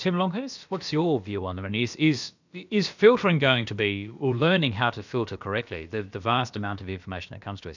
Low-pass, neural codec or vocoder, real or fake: 7.2 kHz; codec, 16 kHz, about 1 kbps, DyCAST, with the encoder's durations; fake